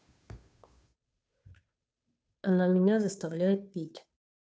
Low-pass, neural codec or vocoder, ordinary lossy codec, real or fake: none; codec, 16 kHz, 2 kbps, FunCodec, trained on Chinese and English, 25 frames a second; none; fake